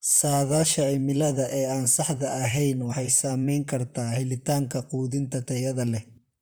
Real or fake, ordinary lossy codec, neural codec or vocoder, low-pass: fake; none; vocoder, 44.1 kHz, 128 mel bands, Pupu-Vocoder; none